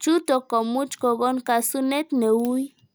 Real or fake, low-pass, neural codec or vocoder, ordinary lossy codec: real; none; none; none